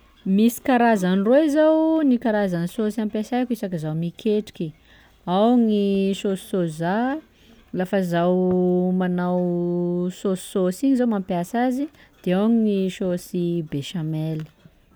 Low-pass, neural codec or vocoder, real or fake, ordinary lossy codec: none; none; real; none